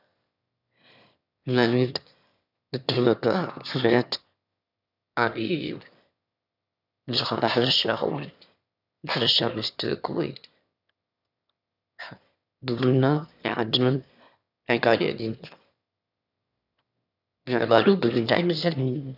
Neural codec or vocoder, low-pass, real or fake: autoencoder, 22.05 kHz, a latent of 192 numbers a frame, VITS, trained on one speaker; 5.4 kHz; fake